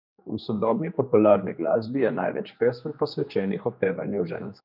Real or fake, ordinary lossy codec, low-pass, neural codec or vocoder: fake; none; 5.4 kHz; codec, 16 kHz, 2 kbps, X-Codec, HuBERT features, trained on general audio